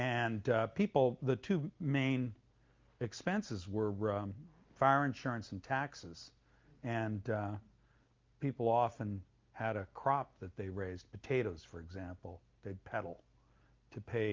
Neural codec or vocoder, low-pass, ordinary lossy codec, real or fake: none; 7.2 kHz; Opus, 32 kbps; real